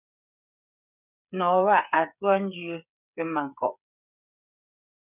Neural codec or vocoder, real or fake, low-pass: codec, 16 kHz, 8 kbps, FreqCodec, smaller model; fake; 3.6 kHz